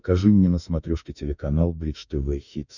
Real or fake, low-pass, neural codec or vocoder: fake; 7.2 kHz; autoencoder, 48 kHz, 32 numbers a frame, DAC-VAE, trained on Japanese speech